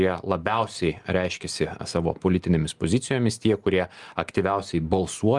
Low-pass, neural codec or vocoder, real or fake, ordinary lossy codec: 10.8 kHz; none; real; Opus, 32 kbps